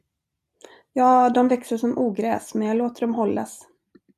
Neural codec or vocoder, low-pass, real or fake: none; 14.4 kHz; real